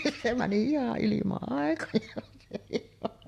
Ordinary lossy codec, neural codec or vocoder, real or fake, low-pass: AAC, 64 kbps; none; real; 14.4 kHz